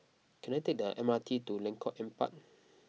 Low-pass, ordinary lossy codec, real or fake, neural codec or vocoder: none; none; real; none